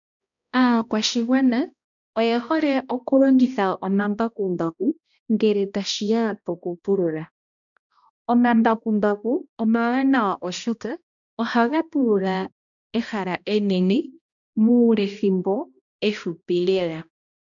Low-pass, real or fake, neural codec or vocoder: 7.2 kHz; fake; codec, 16 kHz, 1 kbps, X-Codec, HuBERT features, trained on balanced general audio